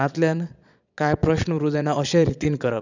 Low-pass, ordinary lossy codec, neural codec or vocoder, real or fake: 7.2 kHz; none; vocoder, 22.05 kHz, 80 mel bands, Vocos; fake